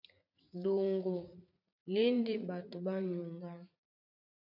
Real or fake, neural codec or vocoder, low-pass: fake; vocoder, 44.1 kHz, 80 mel bands, Vocos; 5.4 kHz